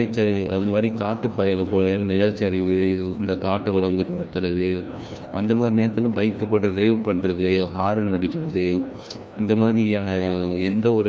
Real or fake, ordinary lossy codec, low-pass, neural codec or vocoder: fake; none; none; codec, 16 kHz, 1 kbps, FreqCodec, larger model